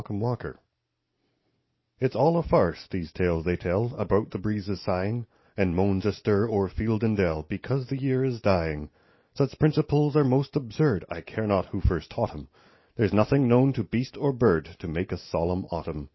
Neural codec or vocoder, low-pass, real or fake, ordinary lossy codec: none; 7.2 kHz; real; MP3, 24 kbps